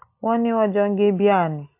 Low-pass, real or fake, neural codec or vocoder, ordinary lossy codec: 3.6 kHz; real; none; AAC, 32 kbps